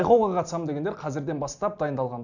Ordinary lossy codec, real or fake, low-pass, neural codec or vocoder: none; real; 7.2 kHz; none